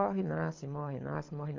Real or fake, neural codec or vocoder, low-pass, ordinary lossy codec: fake; codec, 24 kHz, 6 kbps, HILCodec; 7.2 kHz; MP3, 48 kbps